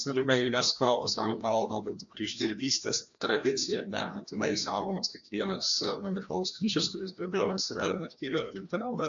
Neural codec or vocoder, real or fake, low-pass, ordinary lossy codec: codec, 16 kHz, 1 kbps, FreqCodec, larger model; fake; 7.2 kHz; AAC, 64 kbps